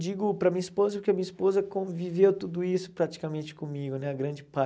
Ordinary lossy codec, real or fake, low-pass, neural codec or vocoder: none; real; none; none